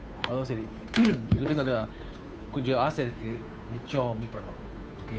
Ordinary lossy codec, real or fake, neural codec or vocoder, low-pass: none; fake; codec, 16 kHz, 2 kbps, FunCodec, trained on Chinese and English, 25 frames a second; none